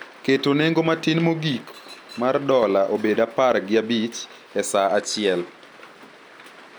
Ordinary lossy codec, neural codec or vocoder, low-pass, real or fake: none; none; none; real